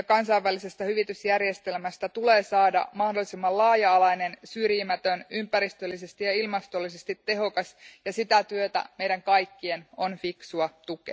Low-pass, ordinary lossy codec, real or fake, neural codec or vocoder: none; none; real; none